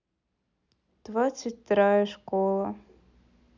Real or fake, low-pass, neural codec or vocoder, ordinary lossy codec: real; 7.2 kHz; none; none